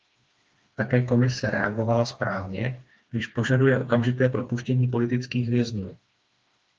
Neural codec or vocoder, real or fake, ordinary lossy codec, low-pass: codec, 16 kHz, 2 kbps, FreqCodec, smaller model; fake; Opus, 24 kbps; 7.2 kHz